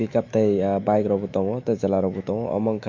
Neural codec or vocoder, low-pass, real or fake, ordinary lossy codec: autoencoder, 48 kHz, 128 numbers a frame, DAC-VAE, trained on Japanese speech; 7.2 kHz; fake; MP3, 48 kbps